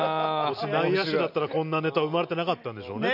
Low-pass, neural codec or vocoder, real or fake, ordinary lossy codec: 5.4 kHz; none; real; none